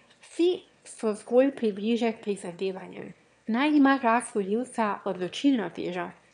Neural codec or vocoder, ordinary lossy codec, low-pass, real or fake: autoencoder, 22.05 kHz, a latent of 192 numbers a frame, VITS, trained on one speaker; none; 9.9 kHz; fake